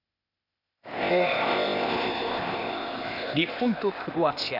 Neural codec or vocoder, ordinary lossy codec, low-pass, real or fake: codec, 16 kHz, 0.8 kbps, ZipCodec; none; 5.4 kHz; fake